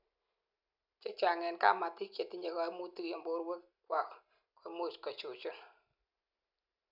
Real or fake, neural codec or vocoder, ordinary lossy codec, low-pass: real; none; none; 5.4 kHz